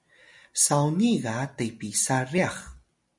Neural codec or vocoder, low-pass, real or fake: none; 10.8 kHz; real